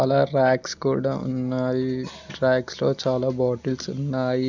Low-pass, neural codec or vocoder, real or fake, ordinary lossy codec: 7.2 kHz; none; real; none